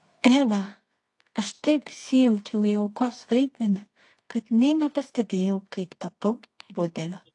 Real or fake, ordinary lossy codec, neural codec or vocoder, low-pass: fake; AAC, 48 kbps; codec, 24 kHz, 0.9 kbps, WavTokenizer, medium music audio release; 10.8 kHz